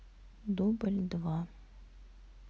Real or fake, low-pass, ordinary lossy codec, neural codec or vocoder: real; none; none; none